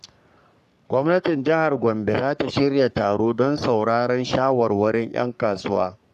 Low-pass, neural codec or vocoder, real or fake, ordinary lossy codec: 14.4 kHz; codec, 44.1 kHz, 3.4 kbps, Pupu-Codec; fake; none